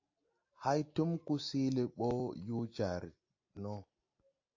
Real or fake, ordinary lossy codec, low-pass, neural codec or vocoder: real; AAC, 48 kbps; 7.2 kHz; none